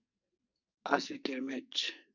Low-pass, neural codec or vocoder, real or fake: 7.2 kHz; codec, 44.1 kHz, 2.6 kbps, SNAC; fake